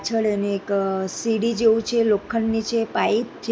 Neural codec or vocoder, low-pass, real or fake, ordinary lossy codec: none; none; real; none